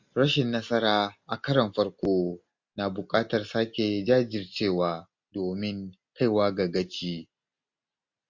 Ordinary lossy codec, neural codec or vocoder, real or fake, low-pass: MP3, 48 kbps; none; real; 7.2 kHz